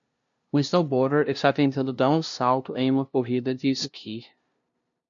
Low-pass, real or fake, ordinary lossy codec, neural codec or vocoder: 7.2 kHz; fake; MP3, 48 kbps; codec, 16 kHz, 0.5 kbps, FunCodec, trained on LibriTTS, 25 frames a second